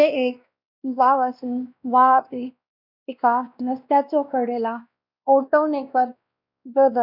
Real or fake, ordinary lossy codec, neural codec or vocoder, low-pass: fake; none; codec, 16 kHz, 1 kbps, X-Codec, WavLM features, trained on Multilingual LibriSpeech; 5.4 kHz